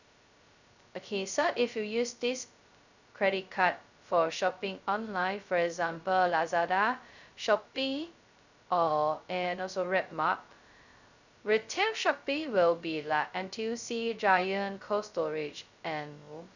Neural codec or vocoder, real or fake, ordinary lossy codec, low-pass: codec, 16 kHz, 0.2 kbps, FocalCodec; fake; none; 7.2 kHz